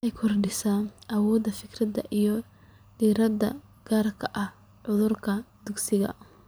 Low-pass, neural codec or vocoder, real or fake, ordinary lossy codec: none; vocoder, 44.1 kHz, 128 mel bands every 256 samples, BigVGAN v2; fake; none